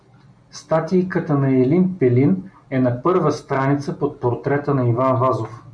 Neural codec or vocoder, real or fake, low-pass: none; real; 9.9 kHz